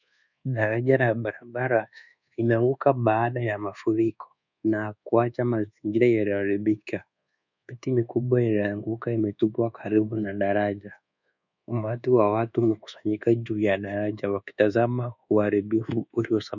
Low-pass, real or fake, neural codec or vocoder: 7.2 kHz; fake; codec, 24 kHz, 1.2 kbps, DualCodec